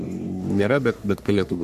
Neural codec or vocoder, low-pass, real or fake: codec, 44.1 kHz, 3.4 kbps, Pupu-Codec; 14.4 kHz; fake